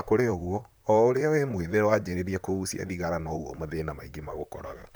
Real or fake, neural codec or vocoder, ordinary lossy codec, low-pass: fake; vocoder, 44.1 kHz, 128 mel bands, Pupu-Vocoder; none; none